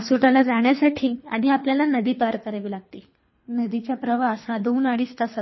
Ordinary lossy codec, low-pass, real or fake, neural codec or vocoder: MP3, 24 kbps; 7.2 kHz; fake; codec, 24 kHz, 3 kbps, HILCodec